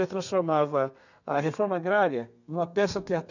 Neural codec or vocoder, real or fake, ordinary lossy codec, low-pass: codec, 32 kHz, 1.9 kbps, SNAC; fake; none; 7.2 kHz